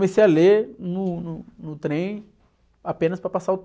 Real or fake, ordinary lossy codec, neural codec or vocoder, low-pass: real; none; none; none